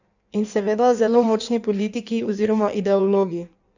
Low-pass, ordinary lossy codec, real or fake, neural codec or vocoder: 7.2 kHz; none; fake; codec, 16 kHz in and 24 kHz out, 1.1 kbps, FireRedTTS-2 codec